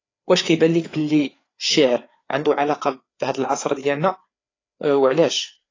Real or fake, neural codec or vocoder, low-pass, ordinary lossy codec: fake; codec, 16 kHz, 4 kbps, FreqCodec, larger model; 7.2 kHz; AAC, 32 kbps